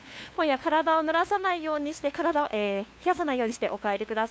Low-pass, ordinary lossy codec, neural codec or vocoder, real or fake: none; none; codec, 16 kHz, 2 kbps, FunCodec, trained on LibriTTS, 25 frames a second; fake